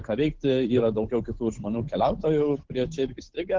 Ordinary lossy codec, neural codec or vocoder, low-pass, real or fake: Opus, 16 kbps; codec, 16 kHz, 8 kbps, FunCodec, trained on Chinese and English, 25 frames a second; 7.2 kHz; fake